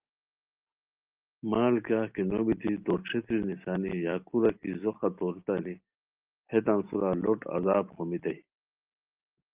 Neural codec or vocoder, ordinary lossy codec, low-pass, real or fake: none; Opus, 32 kbps; 3.6 kHz; real